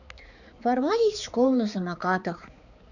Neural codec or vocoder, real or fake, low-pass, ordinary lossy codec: codec, 16 kHz, 4 kbps, X-Codec, HuBERT features, trained on balanced general audio; fake; 7.2 kHz; none